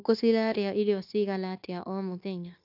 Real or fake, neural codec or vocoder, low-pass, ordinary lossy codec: fake; codec, 16 kHz, 0.9 kbps, LongCat-Audio-Codec; 5.4 kHz; AAC, 48 kbps